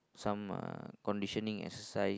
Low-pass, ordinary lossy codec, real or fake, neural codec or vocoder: none; none; real; none